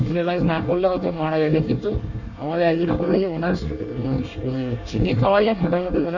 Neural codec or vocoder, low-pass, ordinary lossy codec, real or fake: codec, 24 kHz, 1 kbps, SNAC; 7.2 kHz; none; fake